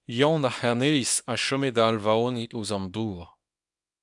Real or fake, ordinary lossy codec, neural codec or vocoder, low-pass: fake; MP3, 96 kbps; codec, 24 kHz, 0.9 kbps, WavTokenizer, small release; 10.8 kHz